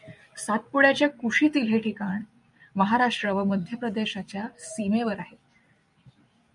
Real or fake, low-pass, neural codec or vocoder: fake; 10.8 kHz; vocoder, 44.1 kHz, 128 mel bands every 256 samples, BigVGAN v2